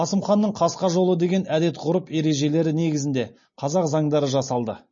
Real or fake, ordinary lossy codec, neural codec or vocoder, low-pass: real; MP3, 32 kbps; none; 7.2 kHz